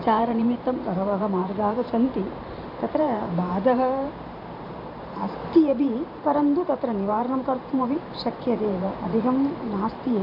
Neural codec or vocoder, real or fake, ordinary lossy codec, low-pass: vocoder, 44.1 kHz, 128 mel bands every 512 samples, BigVGAN v2; fake; MP3, 48 kbps; 5.4 kHz